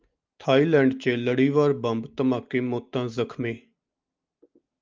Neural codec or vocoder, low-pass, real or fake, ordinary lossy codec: none; 7.2 kHz; real; Opus, 32 kbps